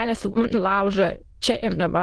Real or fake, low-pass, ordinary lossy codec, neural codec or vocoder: fake; 9.9 kHz; Opus, 16 kbps; autoencoder, 22.05 kHz, a latent of 192 numbers a frame, VITS, trained on many speakers